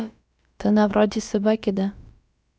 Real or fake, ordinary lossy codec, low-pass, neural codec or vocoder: fake; none; none; codec, 16 kHz, about 1 kbps, DyCAST, with the encoder's durations